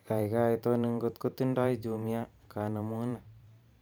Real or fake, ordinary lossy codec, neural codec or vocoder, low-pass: fake; none; vocoder, 44.1 kHz, 128 mel bands every 512 samples, BigVGAN v2; none